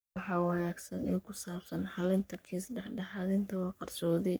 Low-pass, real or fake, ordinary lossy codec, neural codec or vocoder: none; fake; none; codec, 44.1 kHz, 3.4 kbps, Pupu-Codec